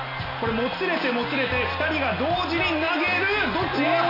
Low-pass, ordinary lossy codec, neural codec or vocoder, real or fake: 5.4 kHz; none; none; real